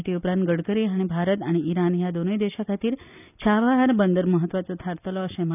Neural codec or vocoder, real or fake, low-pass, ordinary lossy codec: none; real; 3.6 kHz; none